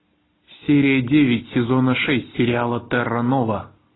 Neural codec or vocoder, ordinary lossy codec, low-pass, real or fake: none; AAC, 16 kbps; 7.2 kHz; real